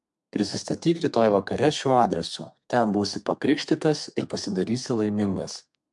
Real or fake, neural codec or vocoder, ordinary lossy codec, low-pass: fake; codec, 32 kHz, 1.9 kbps, SNAC; MP3, 64 kbps; 10.8 kHz